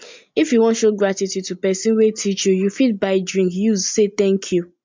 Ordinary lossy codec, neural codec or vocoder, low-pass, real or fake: MP3, 64 kbps; none; 7.2 kHz; real